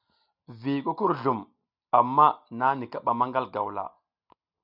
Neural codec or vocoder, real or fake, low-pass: none; real; 5.4 kHz